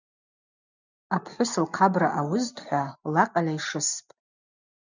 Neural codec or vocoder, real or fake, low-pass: none; real; 7.2 kHz